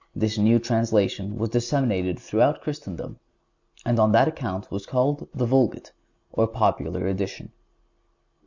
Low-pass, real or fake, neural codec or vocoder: 7.2 kHz; real; none